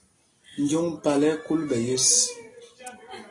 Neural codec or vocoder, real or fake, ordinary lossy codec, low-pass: none; real; AAC, 32 kbps; 10.8 kHz